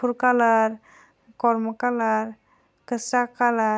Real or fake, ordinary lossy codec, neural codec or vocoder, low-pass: real; none; none; none